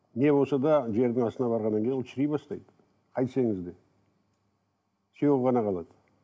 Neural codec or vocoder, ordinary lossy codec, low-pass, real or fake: none; none; none; real